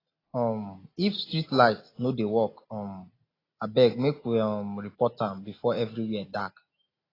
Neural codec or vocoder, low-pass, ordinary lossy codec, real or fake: none; 5.4 kHz; AAC, 24 kbps; real